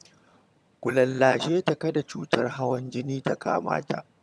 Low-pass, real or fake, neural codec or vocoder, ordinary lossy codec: none; fake; vocoder, 22.05 kHz, 80 mel bands, HiFi-GAN; none